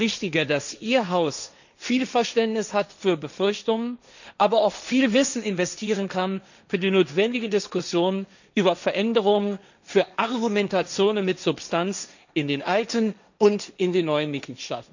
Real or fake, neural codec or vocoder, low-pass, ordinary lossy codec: fake; codec, 16 kHz, 1.1 kbps, Voila-Tokenizer; 7.2 kHz; none